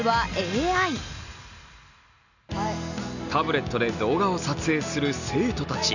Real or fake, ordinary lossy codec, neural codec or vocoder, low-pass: real; none; none; 7.2 kHz